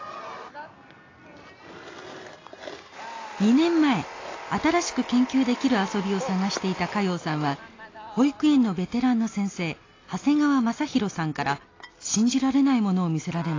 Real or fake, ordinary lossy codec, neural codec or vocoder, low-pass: real; AAC, 32 kbps; none; 7.2 kHz